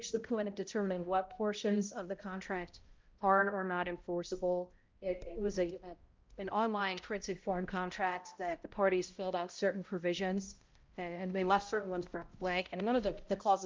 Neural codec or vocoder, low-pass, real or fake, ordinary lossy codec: codec, 16 kHz, 0.5 kbps, X-Codec, HuBERT features, trained on balanced general audio; 7.2 kHz; fake; Opus, 24 kbps